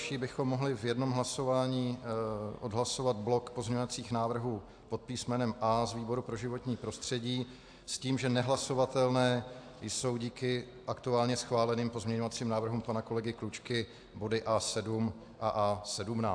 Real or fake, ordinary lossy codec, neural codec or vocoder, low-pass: real; AAC, 64 kbps; none; 9.9 kHz